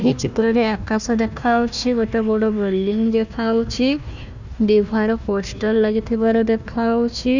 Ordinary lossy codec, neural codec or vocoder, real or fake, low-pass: none; codec, 16 kHz, 1 kbps, FunCodec, trained on Chinese and English, 50 frames a second; fake; 7.2 kHz